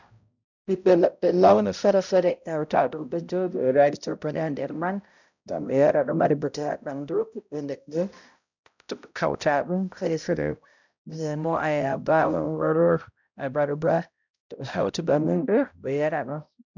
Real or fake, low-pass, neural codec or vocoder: fake; 7.2 kHz; codec, 16 kHz, 0.5 kbps, X-Codec, HuBERT features, trained on balanced general audio